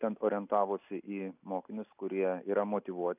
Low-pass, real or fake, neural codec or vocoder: 3.6 kHz; real; none